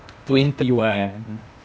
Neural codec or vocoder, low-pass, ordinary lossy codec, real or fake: codec, 16 kHz, 0.8 kbps, ZipCodec; none; none; fake